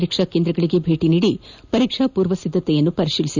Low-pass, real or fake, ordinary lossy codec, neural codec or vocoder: 7.2 kHz; real; none; none